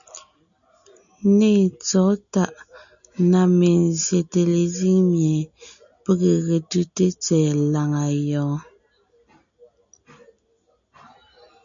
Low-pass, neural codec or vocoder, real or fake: 7.2 kHz; none; real